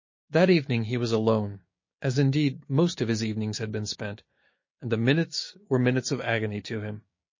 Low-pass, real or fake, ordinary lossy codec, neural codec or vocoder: 7.2 kHz; fake; MP3, 32 kbps; codec, 16 kHz, 6 kbps, DAC